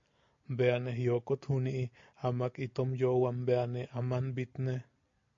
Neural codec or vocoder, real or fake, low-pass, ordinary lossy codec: none; real; 7.2 kHz; AAC, 48 kbps